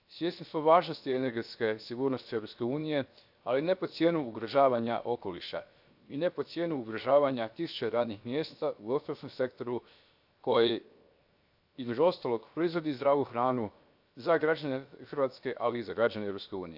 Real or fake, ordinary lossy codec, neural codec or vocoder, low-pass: fake; AAC, 48 kbps; codec, 16 kHz, 0.7 kbps, FocalCodec; 5.4 kHz